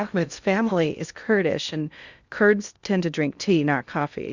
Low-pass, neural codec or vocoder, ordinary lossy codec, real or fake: 7.2 kHz; codec, 16 kHz in and 24 kHz out, 0.6 kbps, FocalCodec, streaming, 2048 codes; Opus, 64 kbps; fake